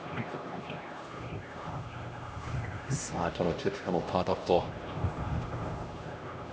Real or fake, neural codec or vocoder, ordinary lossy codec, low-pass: fake; codec, 16 kHz, 1 kbps, X-Codec, HuBERT features, trained on LibriSpeech; none; none